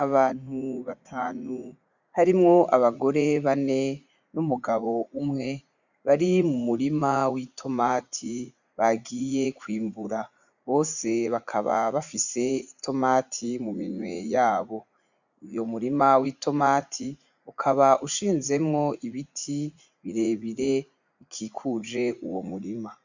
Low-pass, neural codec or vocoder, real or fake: 7.2 kHz; vocoder, 44.1 kHz, 80 mel bands, Vocos; fake